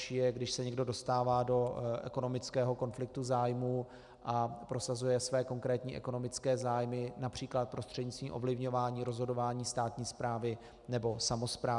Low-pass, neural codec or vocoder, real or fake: 10.8 kHz; none; real